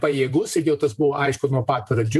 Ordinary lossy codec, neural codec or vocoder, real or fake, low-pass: AAC, 96 kbps; vocoder, 44.1 kHz, 128 mel bands every 512 samples, BigVGAN v2; fake; 14.4 kHz